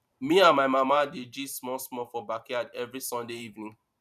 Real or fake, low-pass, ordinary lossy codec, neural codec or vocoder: fake; 14.4 kHz; none; vocoder, 44.1 kHz, 128 mel bands every 512 samples, BigVGAN v2